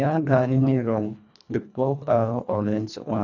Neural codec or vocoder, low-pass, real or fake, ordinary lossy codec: codec, 24 kHz, 1.5 kbps, HILCodec; 7.2 kHz; fake; none